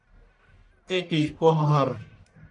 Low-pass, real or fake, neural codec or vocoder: 10.8 kHz; fake; codec, 44.1 kHz, 1.7 kbps, Pupu-Codec